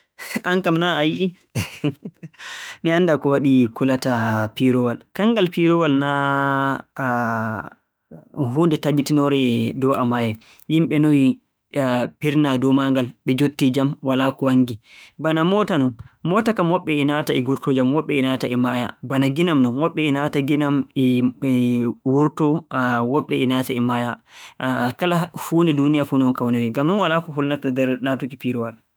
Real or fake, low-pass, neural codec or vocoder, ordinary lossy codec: fake; none; autoencoder, 48 kHz, 32 numbers a frame, DAC-VAE, trained on Japanese speech; none